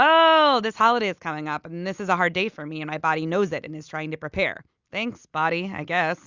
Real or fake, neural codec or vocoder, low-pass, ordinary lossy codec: real; none; 7.2 kHz; Opus, 64 kbps